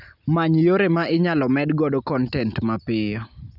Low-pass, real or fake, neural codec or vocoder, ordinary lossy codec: 5.4 kHz; real; none; none